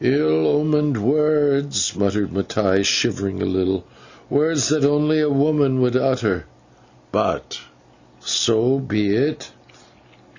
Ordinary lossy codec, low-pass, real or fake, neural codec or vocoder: Opus, 64 kbps; 7.2 kHz; real; none